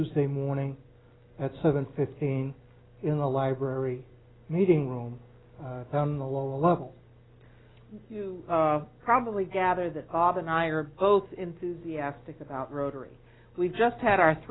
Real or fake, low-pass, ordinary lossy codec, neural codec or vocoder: real; 7.2 kHz; AAC, 16 kbps; none